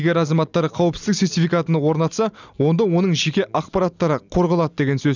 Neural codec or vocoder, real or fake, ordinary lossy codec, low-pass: none; real; none; 7.2 kHz